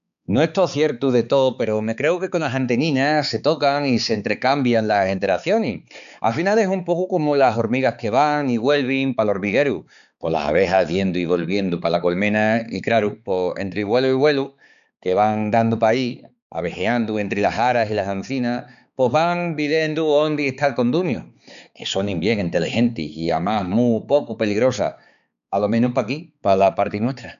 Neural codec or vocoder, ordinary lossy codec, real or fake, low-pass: codec, 16 kHz, 4 kbps, X-Codec, HuBERT features, trained on balanced general audio; none; fake; 7.2 kHz